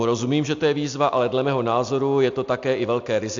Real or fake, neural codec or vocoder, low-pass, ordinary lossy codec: real; none; 7.2 kHz; AAC, 64 kbps